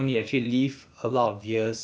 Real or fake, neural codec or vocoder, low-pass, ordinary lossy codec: fake; codec, 16 kHz, 0.8 kbps, ZipCodec; none; none